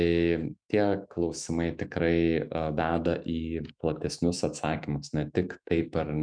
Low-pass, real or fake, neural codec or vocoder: 9.9 kHz; fake; autoencoder, 48 kHz, 128 numbers a frame, DAC-VAE, trained on Japanese speech